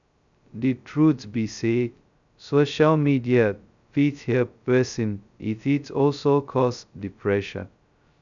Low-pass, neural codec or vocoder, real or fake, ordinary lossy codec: 7.2 kHz; codec, 16 kHz, 0.2 kbps, FocalCodec; fake; none